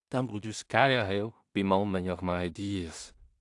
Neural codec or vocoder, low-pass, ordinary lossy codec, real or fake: codec, 16 kHz in and 24 kHz out, 0.4 kbps, LongCat-Audio-Codec, two codebook decoder; 10.8 kHz; AAC, 64 kbps; fake